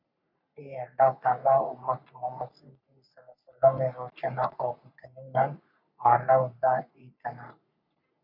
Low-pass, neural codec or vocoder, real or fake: 5.4 kHz; codec, 44.1 kHz, 3.4 kbps, Pupu-Codec; fake